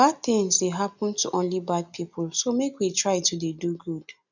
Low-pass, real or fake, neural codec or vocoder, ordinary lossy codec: 7.2 kHz; real; none; none